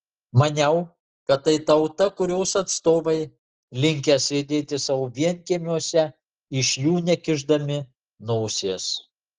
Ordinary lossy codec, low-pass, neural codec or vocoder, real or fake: Opus, 16 kbps; 7.2 kHz; none; real